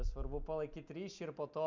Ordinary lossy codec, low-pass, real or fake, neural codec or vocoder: MP3, 64 kbps; 7.2 kHz; real; none